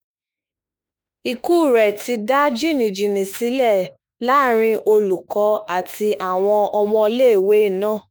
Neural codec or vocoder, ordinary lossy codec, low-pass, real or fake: autoencoder, 48 kHz, 32 numbers a frame, DAC-VAE, trained on Japanese speech; none; none; fake